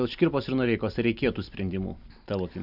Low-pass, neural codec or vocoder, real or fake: 5.4 kHz; none; real